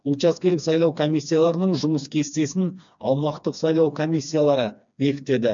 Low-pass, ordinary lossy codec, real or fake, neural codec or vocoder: 7.2 kHz; MP3, 64 kbps; fake; codec, 16 kHz, 2 kbps, FreqCodec, smaller model